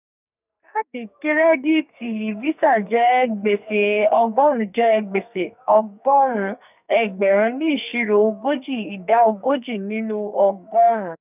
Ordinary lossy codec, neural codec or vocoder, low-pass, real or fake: none; codec, 44.1 kHz, 2.6 kbps, SNAC; 3.6 kHz; fake